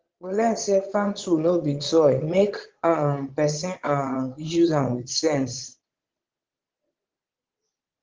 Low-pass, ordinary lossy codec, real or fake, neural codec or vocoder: 7.2 kHz; Opus, 16 kbps; fake; vocoder, 22.05 kHz, 80 mel bands, Vocos